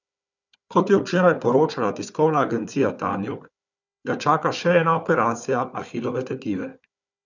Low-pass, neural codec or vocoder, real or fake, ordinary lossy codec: 7.2 kHz; codec, 16 kHz, 4 kbps, FunCodec, trained on Chinese and English, 50 frames a second; fake; none